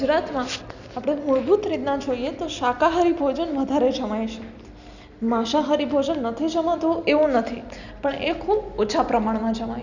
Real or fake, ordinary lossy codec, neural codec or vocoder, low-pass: real; none; none; 7.2 kHz